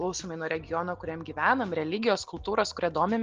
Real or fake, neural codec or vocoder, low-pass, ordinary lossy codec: real; none; 7.2 kHz; Opus, 16 kbps